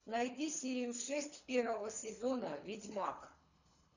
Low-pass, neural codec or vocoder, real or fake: 7.2 kHz; codec, 24 kHz, 3 kbps, HILCodec; fake